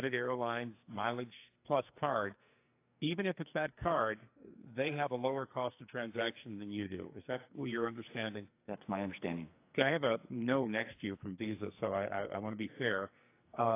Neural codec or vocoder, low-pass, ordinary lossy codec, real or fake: codec, 44.1 kHz, 2.6 kbps, SNAC; 3.6 kHz; AAC, 24 kbps; fake